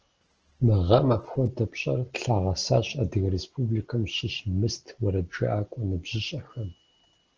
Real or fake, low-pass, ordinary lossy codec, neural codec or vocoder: real; 7.2 kHz; Opus, 16 kbps; none